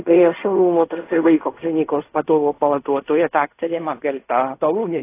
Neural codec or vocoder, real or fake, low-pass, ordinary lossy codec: codec, 16 kHz in and 24 kHz out, 0.4 kbps, LongCat-Audio-Codec, fine tuned four codebook decoder; fake; 3.6 kHz; AAC, 24 kbps